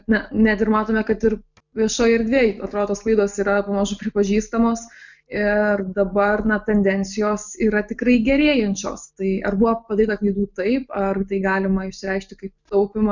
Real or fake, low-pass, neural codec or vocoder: real; 7.2 kHz; none